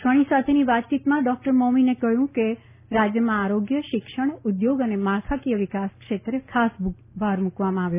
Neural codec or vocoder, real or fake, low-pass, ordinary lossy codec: none; real; 3.6 kHz; none